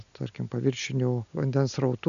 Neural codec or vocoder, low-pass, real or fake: none; 7.2 kHz; real